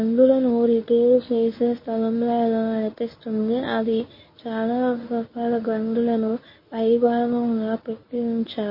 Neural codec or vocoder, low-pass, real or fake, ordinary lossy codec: codec, 24 kHz, 0.9 kbps, WavTokenizer, medium speech release version 1; 5.4 kHz; fake; MP3, 24 kbps